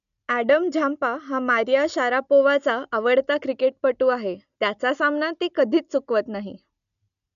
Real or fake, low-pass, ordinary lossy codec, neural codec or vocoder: real; 7.2 kHz; none; none